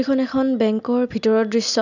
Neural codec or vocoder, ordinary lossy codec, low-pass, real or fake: none; none; 7.2 kHz; real